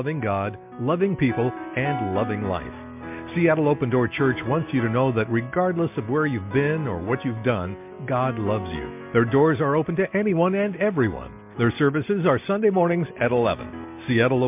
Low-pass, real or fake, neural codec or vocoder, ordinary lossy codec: 3.6 kHz; real; none; MP3, 32 kbps